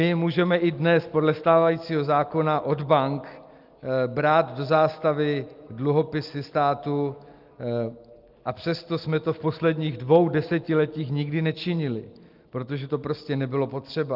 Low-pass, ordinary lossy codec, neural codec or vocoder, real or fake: 5.4 kHz; Opus, 32 kbps; none; real